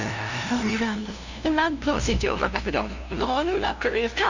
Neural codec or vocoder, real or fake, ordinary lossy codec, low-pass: codec, 16 kHz, 0.5 kbps, FunCodec, trained on LibriTTS, 25 frames a second; fake; none; 7.2 kHz